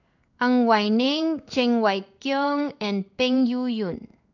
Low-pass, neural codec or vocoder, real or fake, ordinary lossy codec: 7.2 kHz; codec, 16 kHz in and 24 kHz out, 1 kbps, XY-Tokenizer; fake; none